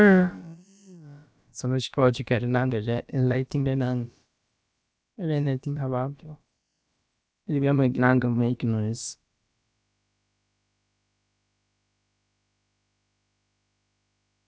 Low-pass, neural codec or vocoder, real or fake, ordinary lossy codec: none; codec, 16 kHz, about 1 kbps, DyCAST, with the encoder's durations; fake; none